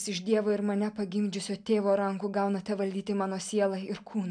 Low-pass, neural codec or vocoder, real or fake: 9.9 kHz; none; real